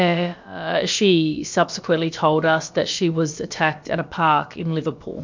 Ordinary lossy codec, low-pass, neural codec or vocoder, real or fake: MP3, 64 kbps; 7.2 kHz; codec, 16 kHz, about 1 kbps, DyCAST, with the encoder's durations; fake